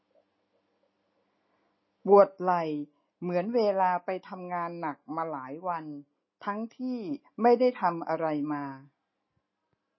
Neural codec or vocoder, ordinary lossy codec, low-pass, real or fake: none; MP3, 24 kbps; 7.2 kHz; real